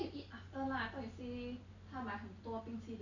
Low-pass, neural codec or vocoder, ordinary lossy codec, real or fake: 7.2 kHz; none; none; real